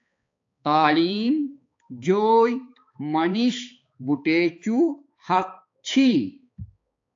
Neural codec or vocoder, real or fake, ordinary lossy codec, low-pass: codec, 16 kHz, 4 kbps, X-Codec, HuBERT features, trained on balanced general audio; fake; AAC, 48 kbps; 7.2 kHz